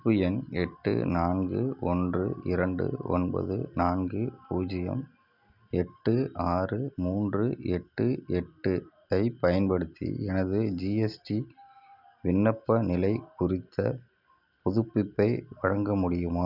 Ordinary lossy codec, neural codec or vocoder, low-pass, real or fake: none; none; 5.4 kHz; real